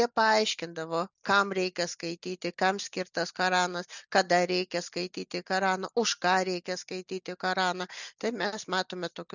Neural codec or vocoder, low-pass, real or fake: none; 7.2 kHz; real